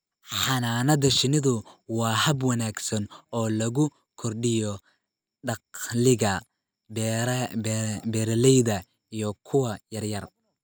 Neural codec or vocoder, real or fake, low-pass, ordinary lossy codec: none; real; none; none